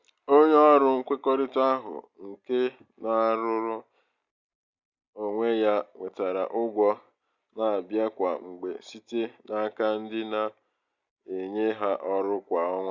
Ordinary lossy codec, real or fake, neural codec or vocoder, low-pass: Opus, 64 kbps; real; none; 7.2 kHz